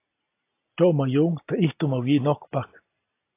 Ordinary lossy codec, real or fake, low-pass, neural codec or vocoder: AAC, 24 kbps; real; 3.6 kHz; none